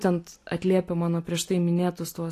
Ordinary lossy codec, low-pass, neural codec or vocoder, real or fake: AAC, 48 kbps; 14.4 kHz; none; real